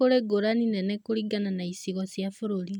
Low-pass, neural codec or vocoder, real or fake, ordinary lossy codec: 19.8 kHz; none; real; none